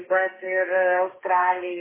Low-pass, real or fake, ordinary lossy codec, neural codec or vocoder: 3.6 kHz; fake; MP3, 16 kbps; codec, 32 kHz, 1.9 kbps, SNAC